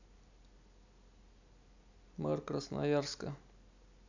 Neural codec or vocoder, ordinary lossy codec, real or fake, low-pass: none; none; real; 7.2 kHz